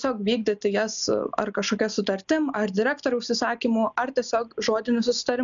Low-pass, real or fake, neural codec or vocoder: 7.2 kHz; real; none